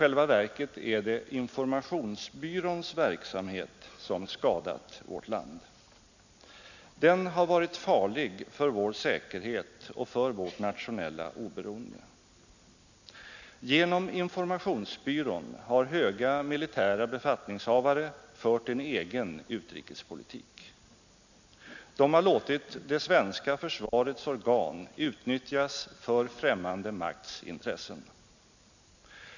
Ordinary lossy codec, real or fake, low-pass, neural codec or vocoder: none; real; 7.2 kHz; none